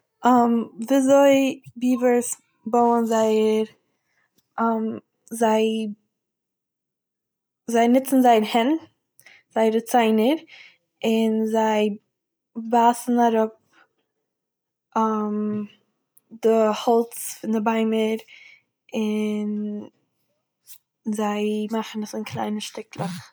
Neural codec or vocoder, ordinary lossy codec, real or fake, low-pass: none; none; real; none